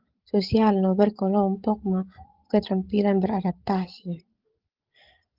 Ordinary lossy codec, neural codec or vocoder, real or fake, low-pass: Opus, 16 kbps; codec, 16 kHz, 16 kbps, FreqCodec, larger model; fake; 5.4 kHz